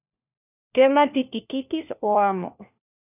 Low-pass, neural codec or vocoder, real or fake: 3.6 kHz; codec, 16 kHz, 1 kbps, FunCodec, trained on LibriTTS, 50 frames a second; fake